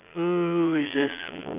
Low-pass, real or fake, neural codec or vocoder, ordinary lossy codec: 3.6 kHz; fake; vocoder, 22.05 kHz, 80 mel bands, Vocos; none